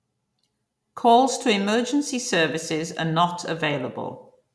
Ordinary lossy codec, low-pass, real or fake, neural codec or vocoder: none; none; real; none